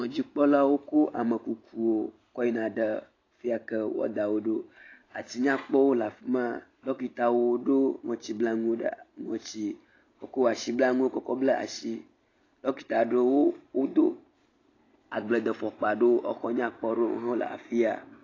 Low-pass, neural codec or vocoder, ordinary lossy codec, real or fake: 7.2 kHz; none; AAC, 32 kbps; real